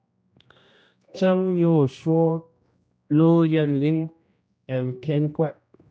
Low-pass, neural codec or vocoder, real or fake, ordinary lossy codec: none; codec, 16 kHz, 1 kbps, X-Codec, HuBERT features, trained on general audio; fake; none